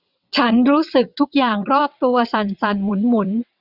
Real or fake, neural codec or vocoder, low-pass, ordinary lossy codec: fake; vocoder, 22.05 kHz, 80 mel bands, WaveNeXt; 5.4 kHz; none